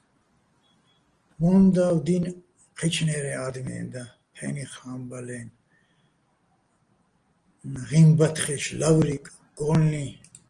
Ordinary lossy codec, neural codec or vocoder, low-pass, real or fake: Opus, 24 kbps; none; 9.9 kHz; real